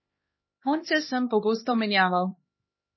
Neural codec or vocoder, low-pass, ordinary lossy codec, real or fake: codec, 16 kHz, 2 kbps, X-Codec, HuBERT features, trained on LibriSpeech; 7.2 kHz; MP3, 24 kbps; fake